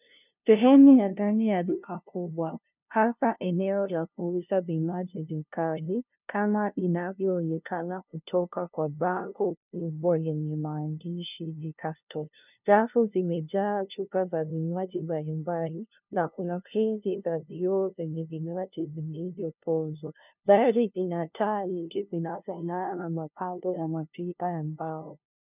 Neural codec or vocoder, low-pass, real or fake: codec, 16 kHz, 0.5 kbps, FunCodec, trained on LibriTTS, 25 frames a second; 3.6 kHz; fake